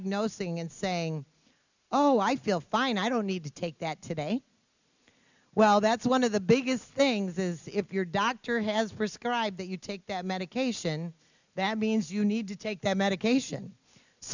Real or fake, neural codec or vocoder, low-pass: real; none; 7.2 kHz